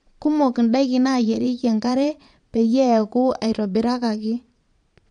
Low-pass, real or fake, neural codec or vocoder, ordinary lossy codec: 9.9 kHz; fake; vocoder, 22.05 kHz, 80 mel bands, Vocos; MP3, 96 kbps